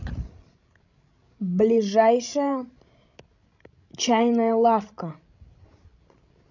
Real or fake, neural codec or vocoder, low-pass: fake; codec, 16 kHz, 16 kbps, FreqCodec, larger model; 7.2 kHz